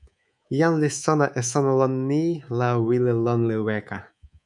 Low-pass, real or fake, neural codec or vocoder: 10.8 kHz; fake; codec, 24 kHz, 3.1 kbps, DualCodec